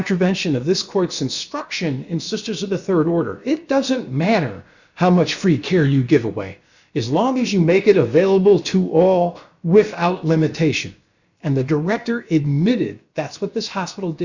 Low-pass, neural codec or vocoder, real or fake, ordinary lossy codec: 7.2 kHz; codec, 16 kHz, about 1 kbps, DyCAST, with the encoder's durations; fake; Opus, 64 kbps